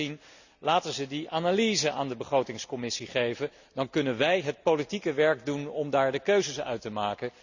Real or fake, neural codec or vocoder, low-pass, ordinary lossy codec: real; none; 7.2 kHz; none